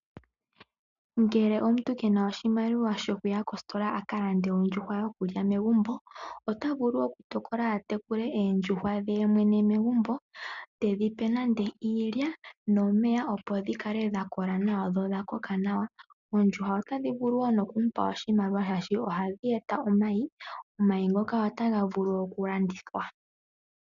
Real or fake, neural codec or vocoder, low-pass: real; none; 7.2 kHz